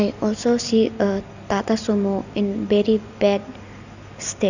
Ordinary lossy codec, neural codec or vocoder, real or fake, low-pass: none; none; real; 7.2 kHz